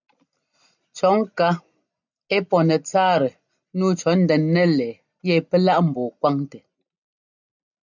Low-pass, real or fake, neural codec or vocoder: 7.2 kHz; real; none